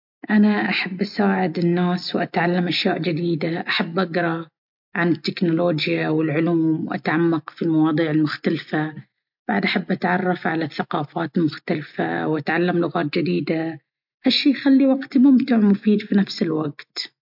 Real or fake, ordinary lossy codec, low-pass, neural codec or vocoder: real; MP3, 48 kbps; 5.4 kHz; none